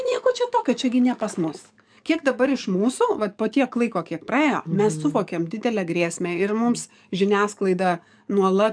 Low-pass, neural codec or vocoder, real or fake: 9.9 kHz; codec, 44.1 kHz, 7.8 kbps, DAC; fake